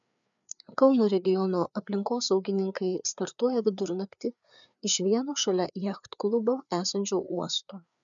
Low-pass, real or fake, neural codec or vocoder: 7.2 kHz; fake; codec, 16 kHz, 4 kbps, FreqCodec, larger model